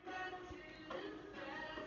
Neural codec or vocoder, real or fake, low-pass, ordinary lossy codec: none; real; 7.2 kHz; none